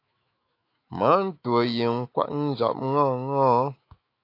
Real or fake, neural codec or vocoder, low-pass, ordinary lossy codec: fake; autoencoder, 48 kHz, 128 numbers a frame, DAC-VAE, trained on Japanese speech; 5.4 kHz; AAC, 32 kbps